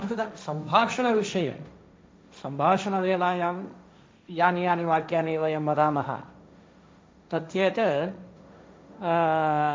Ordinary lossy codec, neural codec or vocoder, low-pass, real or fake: none; codec, 16 kHz, 1.1 kbps, Voila-Tokenizer; none; fake